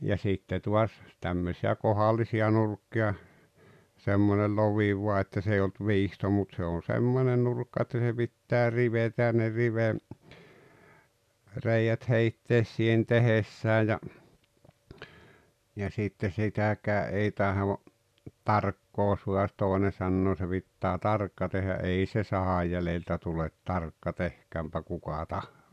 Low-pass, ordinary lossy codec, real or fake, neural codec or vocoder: 14.4 kHz; none; real; none